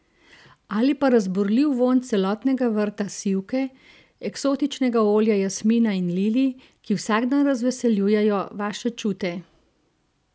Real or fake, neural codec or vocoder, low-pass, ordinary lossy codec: real; none; none; none